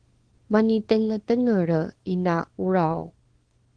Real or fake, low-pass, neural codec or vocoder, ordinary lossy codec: fake; 9.9 kHz; codec, 24 kHz, 0.9 kbps, WavTokenizer, small release; Opus, 16 kbps